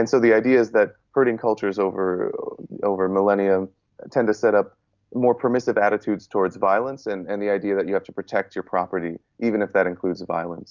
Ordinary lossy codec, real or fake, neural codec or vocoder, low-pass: Opus, 64 kbps; real; none; 7.2 kHz